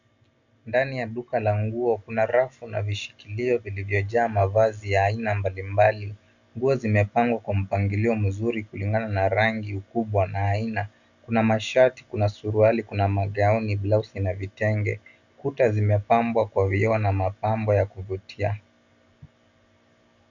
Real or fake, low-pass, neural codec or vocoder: real; 7.2 kHz; none